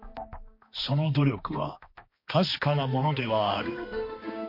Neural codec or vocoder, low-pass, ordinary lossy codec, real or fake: codec, 16 kHz, 2 kbps, X-Codec, HuBERT features, trained on balanced general audio; 5.4 kHz; MP3, 32 kbps; fake